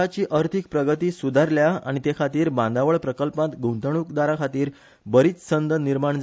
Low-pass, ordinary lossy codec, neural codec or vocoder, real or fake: none; none; none; real